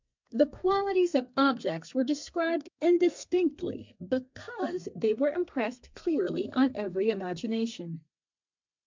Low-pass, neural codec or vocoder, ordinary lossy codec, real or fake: 7.2 kHz; codec, 32 kHz, 1.9 kbps, SNAC; MP3, 64 kbps; fake